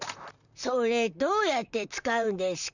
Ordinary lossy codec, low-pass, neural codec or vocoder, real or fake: none; 7.2 kHz; vocoder, 44.1 kHz, 128 mel bands, Pupu-Vocoder; fake